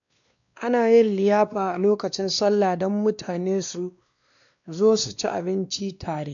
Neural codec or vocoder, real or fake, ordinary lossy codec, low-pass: codec, 16 kHz, 2 kbps, X-Codec, WavLM features, trained on Multilingual LibriSpeech; fake; none; 7.2 kHz